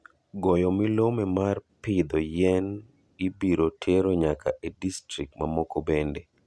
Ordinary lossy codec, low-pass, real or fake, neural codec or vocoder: none; none; real; none